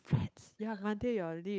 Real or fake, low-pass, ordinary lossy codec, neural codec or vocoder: fake; none; none; codec, 16 kHz, 8 kbps, FunCodec, trained on Chinese and English, 25 frames a second